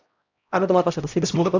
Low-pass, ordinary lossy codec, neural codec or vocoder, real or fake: 7.2 kHz; AAC, 48 kbps; codec, 16 kHz, 0.5 kbps, X-Codec, HuBERT features, trained on LibriSpeech; fake